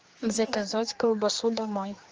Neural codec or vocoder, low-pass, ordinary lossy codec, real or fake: codec, 16 kHz, 2 kbps, X-Codec, HuBERT features, trained on general audio; 7.2 kHz; Opus, 24 kbps; fake